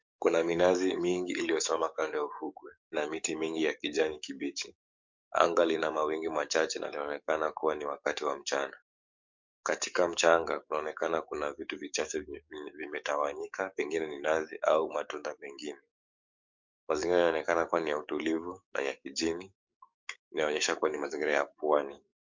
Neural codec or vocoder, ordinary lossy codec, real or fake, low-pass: codec, 44.1 kHz, 7.8 kbps, DAC; MP3, 64 kbps; fake; 7.2 kHz